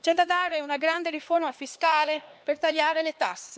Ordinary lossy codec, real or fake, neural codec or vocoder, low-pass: none; fake; codec, 16 kHz, 2 kbps, X-Codec, HuBERT features, trained on LibriSpeech; none